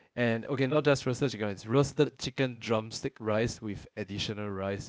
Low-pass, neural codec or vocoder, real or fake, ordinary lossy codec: none; codec, 16 kHz, 0.8 kbps, ZipCodec; fake; none